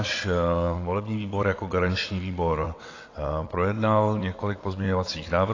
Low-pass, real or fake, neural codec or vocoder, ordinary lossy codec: 7.2 kHz; fake; vocoder, 22.05 kHz, 80 mel bands, WaveNeXt; AAC, 32 kbps